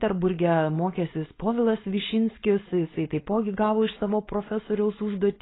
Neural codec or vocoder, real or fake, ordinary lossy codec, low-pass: codec, 16 kHz, 4.8 kbps, FACodec; fake; AAC, 16 kbps; 7.2 kHz